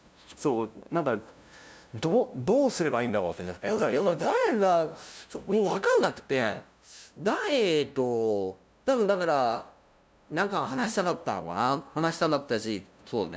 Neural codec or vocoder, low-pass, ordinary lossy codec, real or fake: codec, 16 kHz, 0.5 kbps, FunCodec, trained on LibriTTS, 25 frames a second; none; none; fake